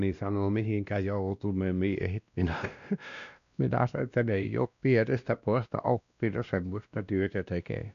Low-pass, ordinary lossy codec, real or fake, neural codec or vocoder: 7.2 kHz; none; fake; codec, 16 kHz, 1 kbps, X-Codec, WavLM features, trained on Multilingual LibriSpeech